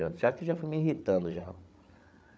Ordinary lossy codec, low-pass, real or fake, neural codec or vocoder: none; none; fake; codec, 16 kHz, 8 kbps, FreqCodec, larger model